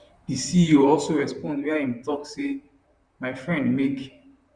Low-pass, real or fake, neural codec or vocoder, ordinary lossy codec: 9.9 kHz; fake; vocoder, 44.1 kHz, 128 mel bands, Pupu-Vocoder; Opus, 64 kbps